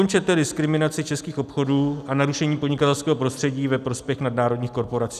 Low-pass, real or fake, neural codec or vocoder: 14.4 kHz; real; none